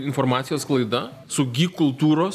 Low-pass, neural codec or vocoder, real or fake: 14.4 kHz; none; real